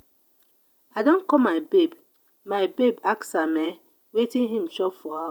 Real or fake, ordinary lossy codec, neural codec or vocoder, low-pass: fake; none; vocoder, 48 kHz, 128 mel bands, Vocos; none